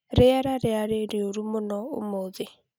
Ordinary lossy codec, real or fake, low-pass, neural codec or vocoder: none; real; 19.8 kHz; none